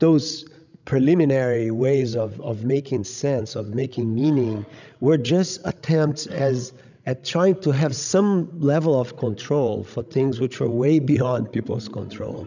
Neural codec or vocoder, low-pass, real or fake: codec, 16 kHz, 16 kbps, FreqCodec, larger model; 7.2 kHz; fake